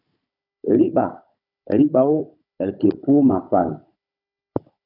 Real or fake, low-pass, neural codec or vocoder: fake; 5.4 kHz; codec, 16 kHz, 16 kbps, FunCodec, trained on Chinese and English, 50 frames a second